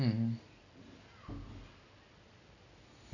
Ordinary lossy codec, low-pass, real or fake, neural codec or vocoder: none; 7.2 kHz; real; none